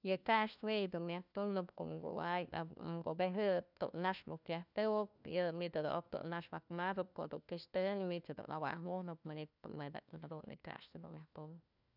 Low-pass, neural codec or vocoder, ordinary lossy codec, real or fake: 5.4 kHz; codec, 16 kHz, 1 kbps, FunCodec, trained on LibriTTS, 50 frames a second; none; fake